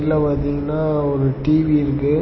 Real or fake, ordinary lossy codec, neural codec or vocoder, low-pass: real; MP3, 24 kbps; none; 7.2 kHz